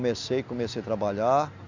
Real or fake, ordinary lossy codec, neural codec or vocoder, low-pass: real; none; none; 7.2 kHz